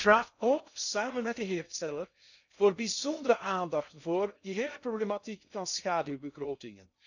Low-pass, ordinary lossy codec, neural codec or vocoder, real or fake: 7.2 kHz; none; codec, 16 kHz in and 24 kHz out, 0.6 kbps, FocalCodec, streaming, 2048 codes; fake